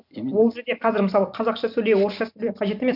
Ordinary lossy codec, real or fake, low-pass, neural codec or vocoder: none; fake; 5.4 kHz; vocoder, 44.1 kHz, 128 mel bands every 256 samples, BigVGAN v2